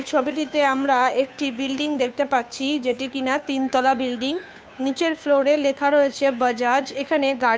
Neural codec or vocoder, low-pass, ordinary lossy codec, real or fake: codec, 16 kHz, 2 kbps, FunCodec, trained on Chinese and English, 25 frames a second; none; none; fake